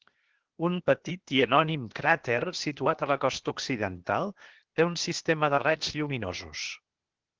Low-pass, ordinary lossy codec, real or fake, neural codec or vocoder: 7.2 kHz; Opus, 16 kbps; fake; codec, 16 kHz, 0.8 kbps, ZipCodec